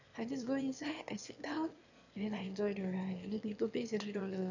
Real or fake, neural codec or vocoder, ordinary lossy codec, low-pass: fake; autoencoder, 22.05 kHz, a latent of 192 numbers a frame, VITS, trained on one speaker; none; 7.2 kHz